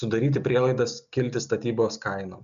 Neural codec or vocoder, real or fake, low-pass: none; real; 7.2 kHz